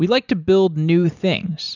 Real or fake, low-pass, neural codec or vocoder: real; 7.2 kHz; none